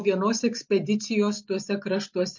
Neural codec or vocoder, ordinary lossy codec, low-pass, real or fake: none; MP3, 48 kbps; 7.2 kHz; real